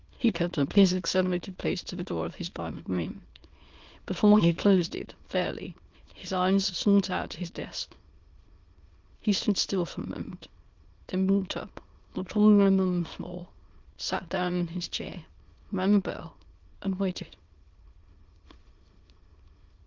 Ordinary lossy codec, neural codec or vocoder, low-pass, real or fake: Opus, 32 kbps; autoencoder, 22.05 kHz, a latent of 192 numbers a frame, VITS, trained on many speakers; 7.2 kHz; fake